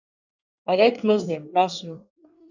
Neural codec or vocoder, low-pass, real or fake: codec, 24 kHz, 1 kbps, SNAC; 7.2 kHz; fake